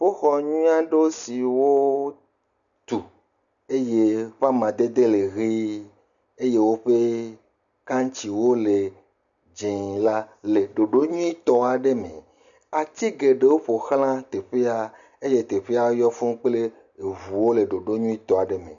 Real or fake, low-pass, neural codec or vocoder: real; 7.2 kHz; none